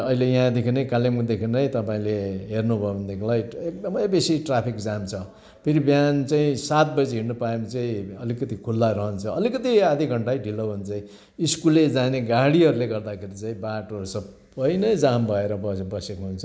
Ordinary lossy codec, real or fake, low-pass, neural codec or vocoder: none; real; none; none